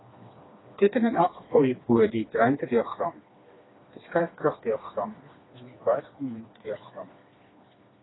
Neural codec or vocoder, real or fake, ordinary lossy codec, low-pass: codec, 16 kHz, 2 kbps, FreqCodec, smaller model; fake; AAC, 16 kbps; 7.2 kHz